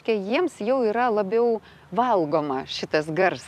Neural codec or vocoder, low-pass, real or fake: vocoder, 44.1 kHz, 128 mel bands every 256 samples, BigVGAN v2; 14.4 kHz; fake